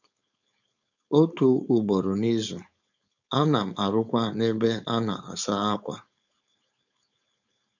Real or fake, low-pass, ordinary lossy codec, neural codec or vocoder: fake; 7.2 kHz; none; codec, 16 kHz, 4.8 kbps, FACodec